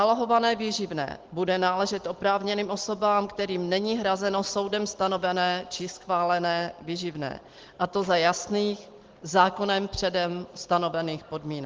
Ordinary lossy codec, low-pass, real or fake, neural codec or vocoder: Opus, 16 kbps; 7.2 kHz; real; none